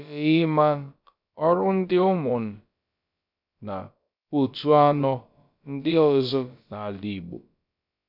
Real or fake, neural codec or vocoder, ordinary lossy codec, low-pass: fake; codec, 16 kHz, about 1 kbps, DyCAST, with the encoder's durations; none; 5.4 kHz